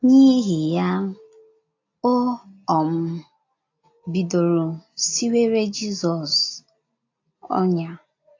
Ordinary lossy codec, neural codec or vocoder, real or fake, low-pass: AAC, 48 kbps; none; real; 7.2 kHz